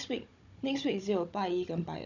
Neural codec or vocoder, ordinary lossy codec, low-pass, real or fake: codec, 16 kHz, 16 kbps, FunCodec, trained on Chinese and English, 50 frames a second; none; 7.2 kHz; fake